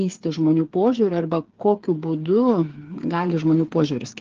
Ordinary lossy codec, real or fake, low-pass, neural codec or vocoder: Opus, 16 kbps; fake; 7.2 kHz; codec, 16 kHz, 8 kbps, FreqCodec, smaller model